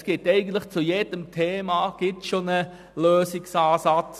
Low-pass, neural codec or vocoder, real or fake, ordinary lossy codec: 14.4 kHz; none; real; none